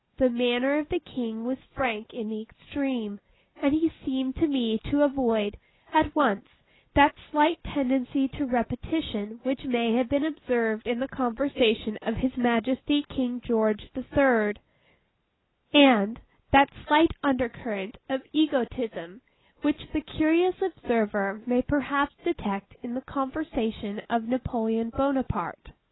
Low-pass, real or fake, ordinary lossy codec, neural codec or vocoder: 7.2 kHz; real; AAC, 16 kbps; none